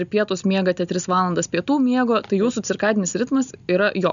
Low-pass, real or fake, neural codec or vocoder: 7.2 kHz; real; none